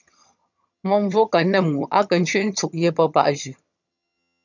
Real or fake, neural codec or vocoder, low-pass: fake; vocoder, 22.05 kHz, 80 mel bands, HiFi-GAN; 7.2 kHz